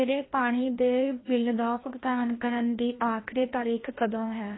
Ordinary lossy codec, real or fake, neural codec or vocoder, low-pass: AAC, 16 kbps; fake; codec, 16 kHz, 1 kbps, FreqCodec, larger model; 7.2 kHz